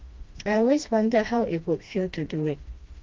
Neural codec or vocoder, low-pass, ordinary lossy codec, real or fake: codec, 16 kHz, 1 kbps, FreqCodec, smaller model; 7.2 kHz; Opus, 32 kbps; fake